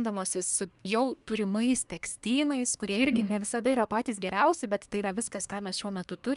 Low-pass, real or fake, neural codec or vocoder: 10.8 kHz; fake; codec, 24 kHz, 1 kbps, SNAC